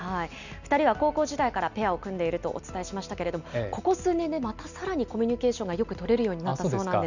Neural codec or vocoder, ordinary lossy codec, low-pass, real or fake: none; none; 7.2 kHz; real